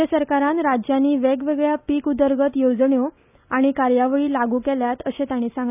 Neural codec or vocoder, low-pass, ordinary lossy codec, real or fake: none; 3.6 kHz; none; real